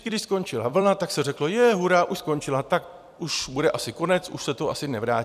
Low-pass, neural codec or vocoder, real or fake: 14.4 kHz; vocoder, 44.1 kHz, 128 mel bands every 256 samples, BigVGAN v2; fake